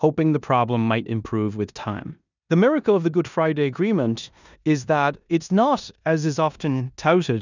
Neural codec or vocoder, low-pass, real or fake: codec, 16 kHz in and 24 kHz out, 0.9 kbps, LongCat-Audio-Codec, four codebook decoder; 7.2 kHz; fake